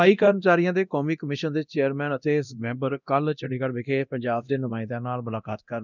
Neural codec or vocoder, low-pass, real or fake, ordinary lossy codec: codec, 24 kHz, 0.9 kbps, DualCodec; 7.2 kHz; fake; none